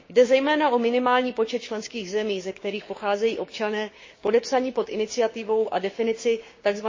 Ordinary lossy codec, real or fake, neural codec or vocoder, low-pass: MP3, 32 kbps; fake; codec, 16 kHz, 6 kbps, DAC; 7.2 kHz